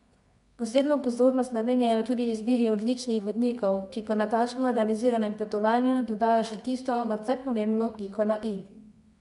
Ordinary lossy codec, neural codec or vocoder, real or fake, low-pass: none; codec, 24 kHz, 0.9 kbps, WavTokenizer, medium music audio release; fake; 10.8 kHz